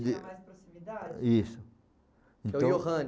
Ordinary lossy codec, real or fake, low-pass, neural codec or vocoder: none; real; none; none